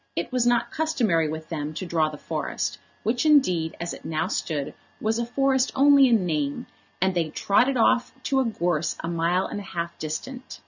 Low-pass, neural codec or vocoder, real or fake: 7.2 kHz; none; real